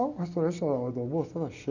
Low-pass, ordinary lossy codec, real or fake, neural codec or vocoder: 7.2 kHz; none; real; none